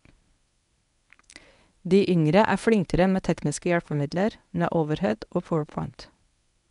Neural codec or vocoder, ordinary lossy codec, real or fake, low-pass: codec, 24 kHz, 0.9 kbps, WavTokenizer, medium speech release version 1; none; fake; 10.8 kHz